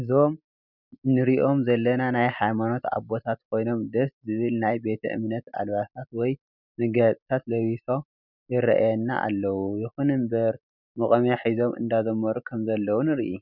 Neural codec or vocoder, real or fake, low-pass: none; real; 5.4 kHz